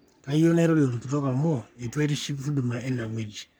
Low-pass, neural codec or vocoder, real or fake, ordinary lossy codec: none; codec, 44.1 kHz, 3.4 kbps, Pupu-Codec; fake; none